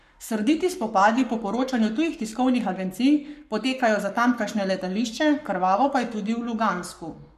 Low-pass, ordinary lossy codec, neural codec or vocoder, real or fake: 14.4 kHz; none; codec, 44.1 kHz, 7.8 kbps, Pupu-Codec; fake